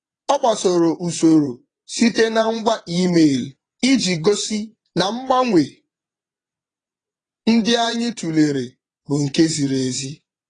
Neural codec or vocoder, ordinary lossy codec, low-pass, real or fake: vocoder, 22.05 kHz, 80 mel bands, WaveNeXt; AAC, 32 kbps; 9.9 kHz; fake